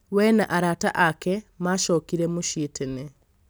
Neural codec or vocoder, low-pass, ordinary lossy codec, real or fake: none; none; none; real